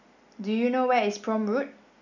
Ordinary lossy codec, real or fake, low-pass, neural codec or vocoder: none; real; 7.2 kHz; none